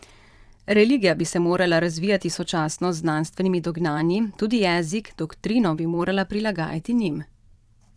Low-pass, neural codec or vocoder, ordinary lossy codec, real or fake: none; vocoder, 22.05 kHz, 80 mel bands, Vocos; none; fake